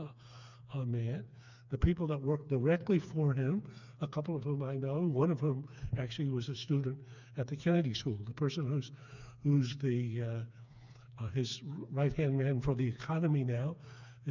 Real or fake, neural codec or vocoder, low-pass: fake; codec, 16 kHz, 4 kbps, FreqCodec, smaller model; 7.2 kHz